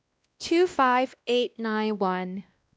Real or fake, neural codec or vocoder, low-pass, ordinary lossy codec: fake; codec, 16 kHz, 1 kbps, X-Codec, WavLM features, trained on Multilingual LibriSpeech; none; none